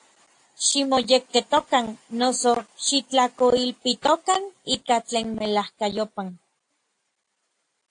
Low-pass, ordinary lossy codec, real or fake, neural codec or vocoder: 9.9 kHz; AAC, 48 kbps; real; none